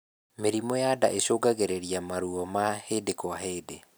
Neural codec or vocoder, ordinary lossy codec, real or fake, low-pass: none; none; real; none